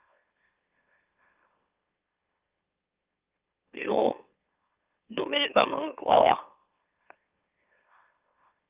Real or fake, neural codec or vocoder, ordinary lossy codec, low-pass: fake; autoencoder, 44.1 kHz, a latent of 192 numbers a frame, MeloTTS; Opus, 32 kbps; 3.6 kHz